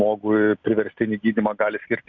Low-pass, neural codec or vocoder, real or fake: 7.2 kHz; none; real